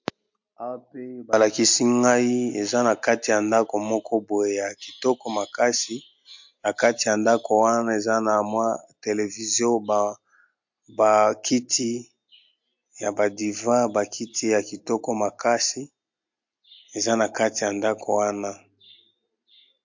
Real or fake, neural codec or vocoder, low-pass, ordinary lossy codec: real; none; 7.2 kHz; MP3, 48 kbps